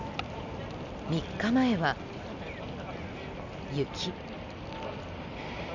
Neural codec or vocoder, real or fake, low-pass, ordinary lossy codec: none; real; 7.2 kHz; none